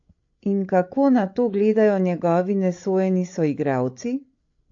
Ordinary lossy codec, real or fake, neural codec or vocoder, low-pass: AAC, 48 kbps; fake; codec, 16 kHz, 16 kbps, FreqCodec, larger model; 7.2 kHz